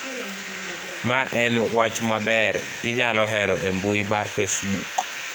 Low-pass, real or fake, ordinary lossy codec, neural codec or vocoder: none; fake; none; codec, 44.1 kHz, 2.6 kbps, SNAC